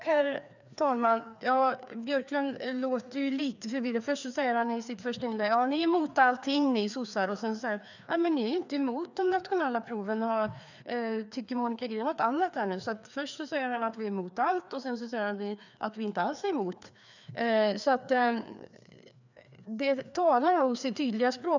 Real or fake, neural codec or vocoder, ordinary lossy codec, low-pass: fake; codec, 16 kHz, 2 kbps, FreqCodec, larger model; none; 7.2 kHz